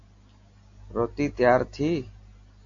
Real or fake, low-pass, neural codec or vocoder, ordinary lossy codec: real; 7.2 kHz; none; AAC, 48 kbps